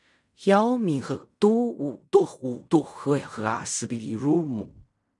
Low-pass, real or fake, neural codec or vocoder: 10.8 kHz; fake; codec, 16 kHz in and 24 kHz out, 0.4 kbps, LongCat-Audio-Codec, fine tuned four codebook decoder